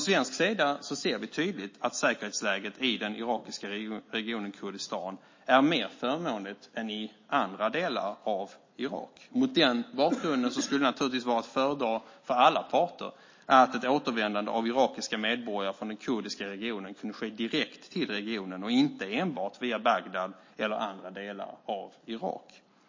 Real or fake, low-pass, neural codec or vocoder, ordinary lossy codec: real; 7.2 kHz; none; MP3, 32 kbps